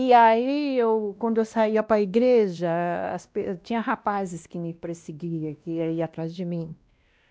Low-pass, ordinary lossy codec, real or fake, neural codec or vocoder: none; none; fake; codec, 16 kHz, 1 kbps, X-Codec, WavLM features, trained on Multilingual LibriSpeech